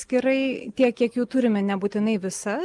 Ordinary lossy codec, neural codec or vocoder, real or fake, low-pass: Opus, 32 kbps; none; real; 10.8 kHz